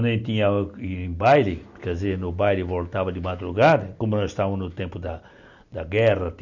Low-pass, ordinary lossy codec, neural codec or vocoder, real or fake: 7.2 kHz; none; none; real